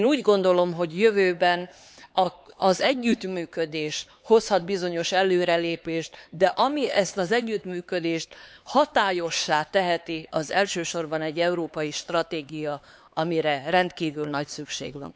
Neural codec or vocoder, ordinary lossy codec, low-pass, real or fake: codec, 16 kHz, 4 kbps, X-Codec, HuBERT features, trained on LibriSpeech; none; none; fake